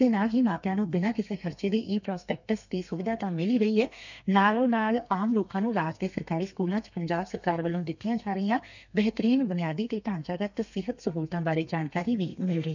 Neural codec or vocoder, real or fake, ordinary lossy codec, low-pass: codec, 32 kHz, 1.9 kbps, SNAC; fake; none; 7.2 kHz